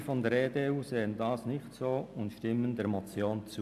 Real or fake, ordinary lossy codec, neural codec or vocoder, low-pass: real; AAC, 96 kbps; none; 14.4 kHz